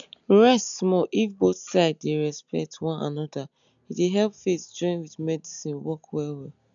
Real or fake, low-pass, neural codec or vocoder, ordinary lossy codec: real; 7.2 kHz; none; none